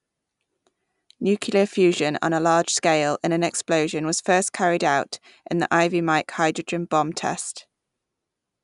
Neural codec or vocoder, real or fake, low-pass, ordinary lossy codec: none; real; 10.8 kHz; none